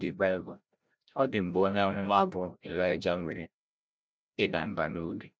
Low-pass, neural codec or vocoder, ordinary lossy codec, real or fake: none; codec, 16 kHz, 0.5 kbps, FreqCodec, larger model; none; fake